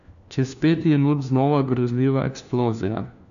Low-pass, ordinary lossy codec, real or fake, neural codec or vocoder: 7.2 kHz; none; fake; codec, 16 kHz, 1 kbps, FunCodec, trained on LibriTTS, 50 frames a second